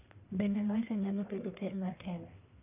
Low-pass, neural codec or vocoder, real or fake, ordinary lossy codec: 3.6 kHz; codec, 44.1 kHz, 1.7 kbps, Pupu-Codec; fake; none